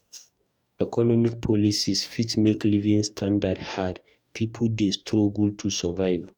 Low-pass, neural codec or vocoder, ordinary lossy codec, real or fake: 19.8 kHz; codec, 44.1 kHz, 2.6 kbps, DAC; none; fake